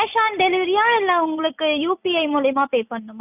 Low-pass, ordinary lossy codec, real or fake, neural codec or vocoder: 3.6 kHz; none; real; none